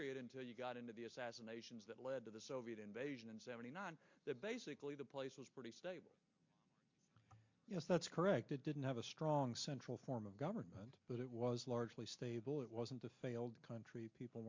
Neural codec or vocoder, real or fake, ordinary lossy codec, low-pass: none; real; MP3, 48 kbps; 7.2 kHz